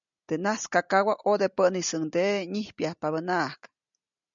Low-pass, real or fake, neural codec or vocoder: 7.2 kHz; real; none